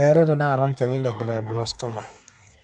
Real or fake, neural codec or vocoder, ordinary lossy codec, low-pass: fake; codec, 24 kHz, 1 kbps, SNAC; none; 10.8 kHz